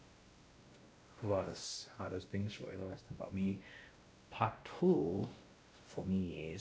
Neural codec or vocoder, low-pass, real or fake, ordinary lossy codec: codec, 16 kHz, 1 kbps, X-Codec, WavLM features, trained on Multilingual LibriSpeech; none; fake; none